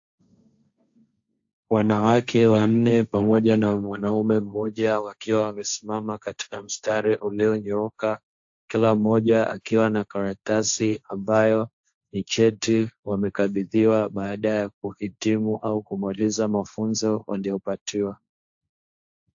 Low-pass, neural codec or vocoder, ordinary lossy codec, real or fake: 7.2 kHz; codec, 16 kHz, 1.1 kbps, Voila-Tokenizer; AAC, 64 kbps; fake